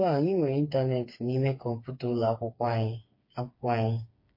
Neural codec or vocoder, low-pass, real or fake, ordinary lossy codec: codec, 16 kHz, 4 kbps, FreqCodec, smaller model; 5.4 kHz; fake; MP3, 32 kbps